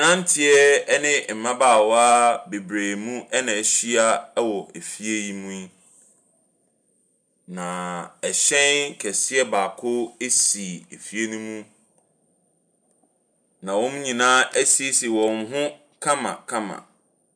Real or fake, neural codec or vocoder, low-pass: real; none; 9.9 kHz